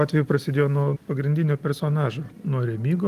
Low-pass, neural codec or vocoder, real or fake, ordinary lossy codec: 14.4 kHz; none; real; Opus, 24 kbps